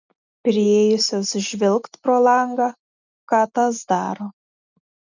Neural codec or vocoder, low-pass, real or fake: none; 7.2 kHz; real